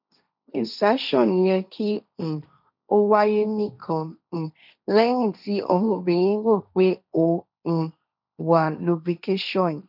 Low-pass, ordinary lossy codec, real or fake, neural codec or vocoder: 5.4 kHz; none; fake; codec, 16 kHz, 1.1 kbps, Voila-Tokenizer